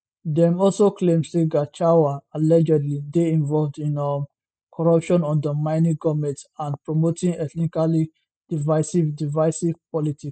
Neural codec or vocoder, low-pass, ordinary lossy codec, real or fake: none; none; none; real